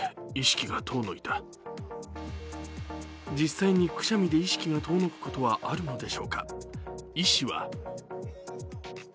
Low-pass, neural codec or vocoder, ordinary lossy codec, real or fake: none; none; none; real